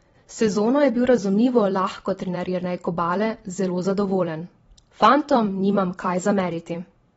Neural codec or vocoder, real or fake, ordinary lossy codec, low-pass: vocoder, 44.1 kHz, 128 mel bands every 256 samples, BigVGAN v2; fake; AAC, 24 kbps; 19.8 kHz